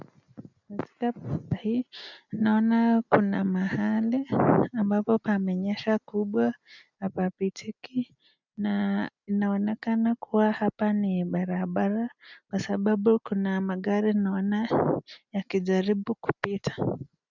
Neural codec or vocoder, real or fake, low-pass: none; real; 7.2 kHz